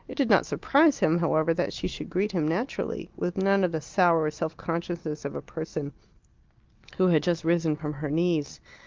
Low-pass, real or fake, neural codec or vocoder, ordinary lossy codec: 7.2 kHz; real; none; Opus, 32 kbps